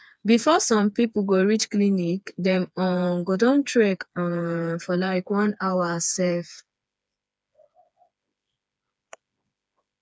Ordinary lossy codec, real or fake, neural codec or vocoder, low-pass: none; fake; codec, 16 kHz, 4 kbps, FreqCodec, smaller model; none